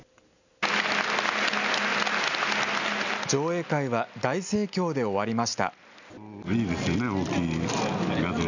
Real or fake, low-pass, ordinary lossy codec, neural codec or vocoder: real; 7.2 kHz; none; none